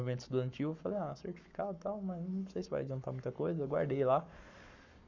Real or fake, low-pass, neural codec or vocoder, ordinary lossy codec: fake; 7.2 kHz; codec, 44.1 kHz, 7.8 kbps, Pupu-Codec; none